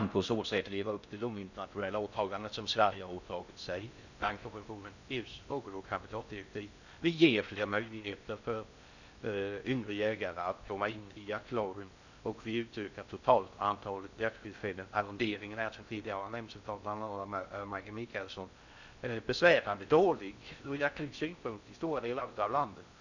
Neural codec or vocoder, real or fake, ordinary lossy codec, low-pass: codec, 16 kHz in and 24 kHz out, 0.6 kbps, FocalCodec, streaming, 4096 codes; fake; none; 7.2 kHz